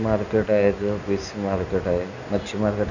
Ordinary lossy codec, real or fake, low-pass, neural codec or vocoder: none; real; 7.2 kHz; none